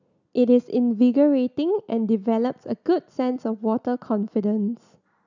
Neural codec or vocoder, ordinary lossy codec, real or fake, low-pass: none; none; real; 7.2 kHz